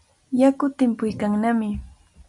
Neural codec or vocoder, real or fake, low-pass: none; real; 10.8 kHz